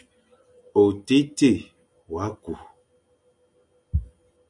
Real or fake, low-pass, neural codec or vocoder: real; 10.8 kHz; none